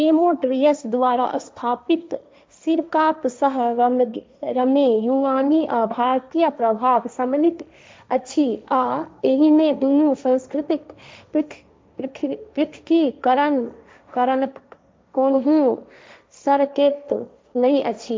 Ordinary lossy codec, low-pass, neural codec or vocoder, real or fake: none; none; codec, 16 kHz, 1.1 kbps, Voila-Tokenizer; fake